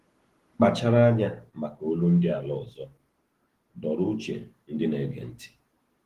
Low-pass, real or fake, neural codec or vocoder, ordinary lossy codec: 14.4 kHz; real; none; Opus, 16 kbps